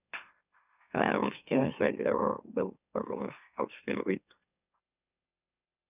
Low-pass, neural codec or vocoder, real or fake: 3.6 kHz; autoencoder, 44.1 kHz, a latent of 192 numbers a frame, MeloTTS; fake